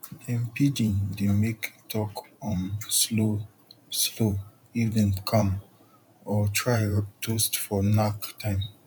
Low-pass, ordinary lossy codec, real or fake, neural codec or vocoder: 19.8 kHz; none; fake; vocoder, 44.1 kHz, 128 mel bands every 512 samples, BigVGAN v2